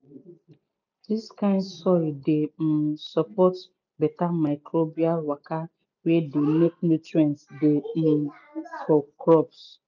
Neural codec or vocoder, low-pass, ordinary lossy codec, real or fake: none; 7.2 kHz; none; real